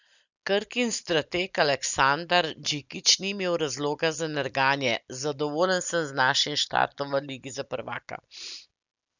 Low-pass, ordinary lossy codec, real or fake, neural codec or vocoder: 7.2 kHz; none; real; none